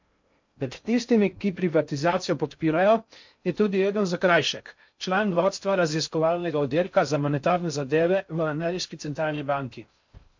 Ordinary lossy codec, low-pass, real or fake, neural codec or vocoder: MP3, 48 kbps; 7.2 kHz; fake; codec, 16 kHz in and 24 kHz out, 0.6 kbps, FocalCodec, streaming, 2048 codes